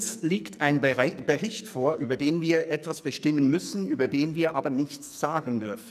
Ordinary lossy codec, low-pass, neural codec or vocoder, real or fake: none; 14.4 kHz; codec, 44.1 kHz, 2.6 kbps, SNAC; fake